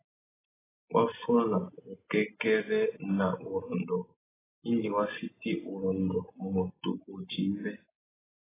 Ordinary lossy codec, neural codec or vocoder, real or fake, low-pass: AAC, 16 kbps; none; real; 3.6 kHz